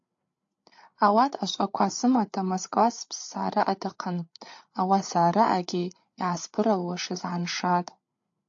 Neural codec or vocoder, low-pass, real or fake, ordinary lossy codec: codec, 16 kHz, 8 kbps, FreqCodec, larger model; 7.2 kHz; fake; AAC, 48 kbps